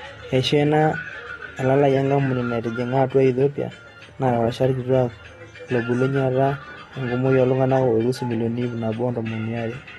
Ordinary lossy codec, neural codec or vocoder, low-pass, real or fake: AAC, 32 kbps; none; 19.8 kHz; real